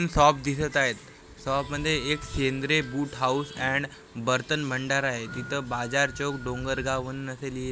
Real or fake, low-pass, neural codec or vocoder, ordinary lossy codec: real; none; none; none